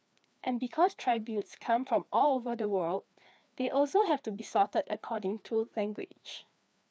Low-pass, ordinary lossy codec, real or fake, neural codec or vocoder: none; none; fake; codec, 16 kHz, 2 kbps, FreqCodec, larger model